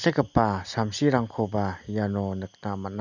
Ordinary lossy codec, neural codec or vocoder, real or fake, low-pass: none; none; real; 7.2 kHz